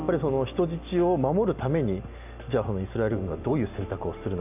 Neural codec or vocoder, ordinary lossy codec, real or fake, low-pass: none; none; real; 3.6 kHz